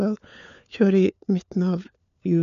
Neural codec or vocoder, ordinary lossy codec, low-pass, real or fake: codec, 16 kHz, 4 kbps, FunCodec, trained on LibriTTS, 50 frames a second; none; 7.2 kHz; fake